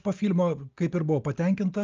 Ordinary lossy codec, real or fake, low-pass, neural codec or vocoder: Opus, 24 kbps; real; 7.2 kHz; none